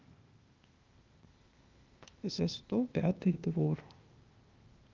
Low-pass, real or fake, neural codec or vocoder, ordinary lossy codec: 7.2 kHz; fake; codec, 16 kHz, 0.8 kbps, ZipCodec; Opus, 32 kbps